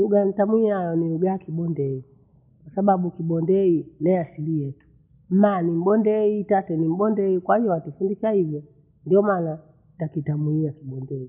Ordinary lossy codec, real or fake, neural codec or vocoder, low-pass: none; real; none; 3.6 kHz